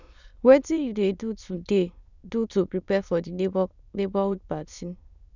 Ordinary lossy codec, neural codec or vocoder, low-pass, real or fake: none; autoencoder, 22.05 kHz, a latent of 192 numbers a frame, VITS, trained on many speakers; 7.2 kHz; fake